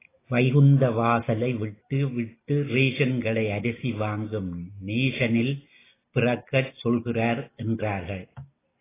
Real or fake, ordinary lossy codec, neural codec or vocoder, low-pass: real; AAC, 16 kbps; none; 3.6 kHz